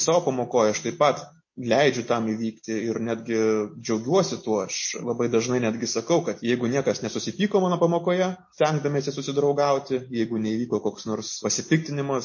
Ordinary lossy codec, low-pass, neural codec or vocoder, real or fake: MP3, 32 kbps; 7.2 kHz; none; real